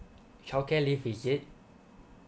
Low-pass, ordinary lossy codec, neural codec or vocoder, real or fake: none; none; none; real